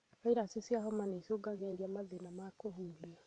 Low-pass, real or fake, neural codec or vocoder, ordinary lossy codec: 9.9 kHz; fake; vocoder, 44.1 kHz, 128 mel bands every 512 samples, BigVGAN v2; MP3, 64 kbps